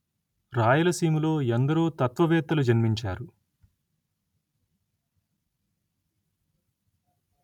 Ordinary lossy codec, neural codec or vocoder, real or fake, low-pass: none; none; real; 19.8 kHz